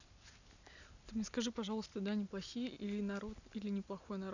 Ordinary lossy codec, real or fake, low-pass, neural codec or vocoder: none; real; 7.2 kHz; none